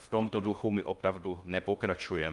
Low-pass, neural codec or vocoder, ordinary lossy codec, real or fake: 10.8 kHz; codec, 16 kHz in and 24 kHz out, 0.6 kbps, FocalCodec, streaming, 2048 codes; Opus, 32 kbps; fake